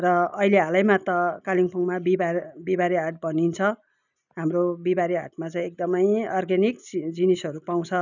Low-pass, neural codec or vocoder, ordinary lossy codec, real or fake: 7.2 kHz; none; none; real